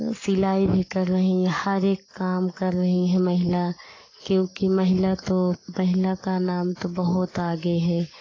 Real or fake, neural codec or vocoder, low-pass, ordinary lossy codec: fake; codec, 44.1 kHz, 7.8 kbps, Pupu-Codec; 7.2 kHz; AAC, 32 kbps